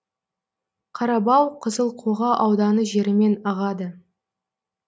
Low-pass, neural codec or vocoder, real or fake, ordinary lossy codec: none; none; real; none